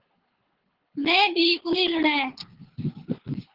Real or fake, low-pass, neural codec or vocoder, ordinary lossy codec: fake; 5.4 kHz; codec, 24 kHz, 6 kbps, HILCodec; Opus, 16 kbps